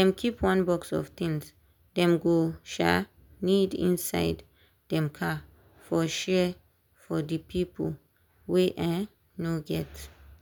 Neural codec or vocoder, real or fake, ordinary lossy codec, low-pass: none; real; none; 19.8 kHz